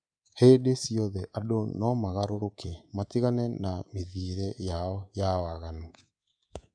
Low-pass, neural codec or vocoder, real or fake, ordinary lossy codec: 9.9 kHz; codec, 24 kHz, 3.1 kbps, DualCodec; fake; none